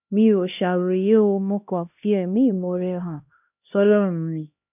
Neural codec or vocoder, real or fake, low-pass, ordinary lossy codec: codec, 16 kHz, 1 kbps, X-Codec, HuBERT features, trained on LibriSpeech; fake; 3.6 kHz; none